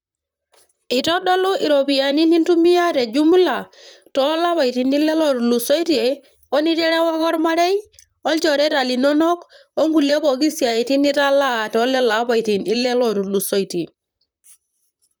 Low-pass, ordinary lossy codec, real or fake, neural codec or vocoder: none; none; fake; vocoder, 44.1 kHz, 128 mel bands, Pupu-Vocoder